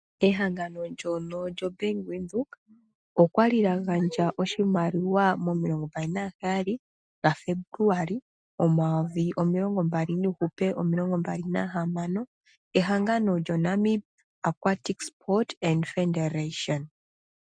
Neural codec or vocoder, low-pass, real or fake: none; 9.9 kHz; real